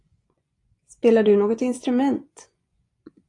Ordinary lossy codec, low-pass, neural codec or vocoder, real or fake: AAC, 48 kbps; 10.8 kHz; vocoder, 24 kHz, 100 mel bands, Vocos; fake